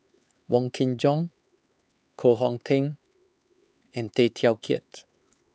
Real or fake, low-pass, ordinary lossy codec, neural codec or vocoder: fake; none; none; codec, 16 kHz, 4 kbps, X-Codec, HuBERT features, trained on LibriSpeech